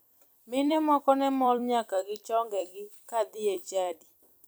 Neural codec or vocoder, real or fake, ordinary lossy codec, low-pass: vocoder, 44.1 kHz, 128 mel bands every 256 samples, BigVGAN v2; fake; none; none